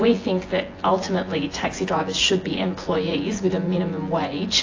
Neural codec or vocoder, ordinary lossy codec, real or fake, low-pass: vocoder, 24 kHz, 100 mel bands, Vocos; AAC, 32 kbps; fake; 7.2 kHz